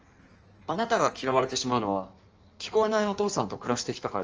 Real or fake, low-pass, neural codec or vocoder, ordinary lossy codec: fake; 7.2 kHz; codec, 16 kHz in and 24 kHz out, 1.1 kbps, FireRedTTS-2 codec; Opus, 24 kbps